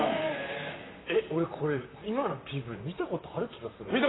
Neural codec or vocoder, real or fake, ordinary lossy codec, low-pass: vocoder, 44.1 kHz, 128 mel bands, Pupu-Vocoder; fake; AAC, 16 kbps; 7.2 kHz